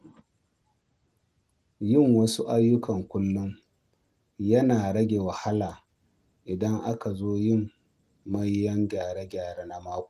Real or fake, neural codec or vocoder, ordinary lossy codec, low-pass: real; none; Opus, 24 kbps; 14.4 kHz